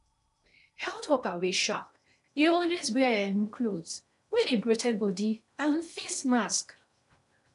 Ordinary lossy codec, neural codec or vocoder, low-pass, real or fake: none; codec, 16 kHz in and 24 kHz out, 0.8 kbps, FocalCodec, streaming, 65536 codes; 10.8 kHz; fake